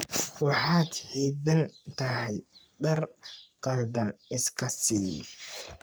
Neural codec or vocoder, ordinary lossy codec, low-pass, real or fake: codec, 44.1 kHz, 3.4 kbps, Pupu-Codec; none; none; fake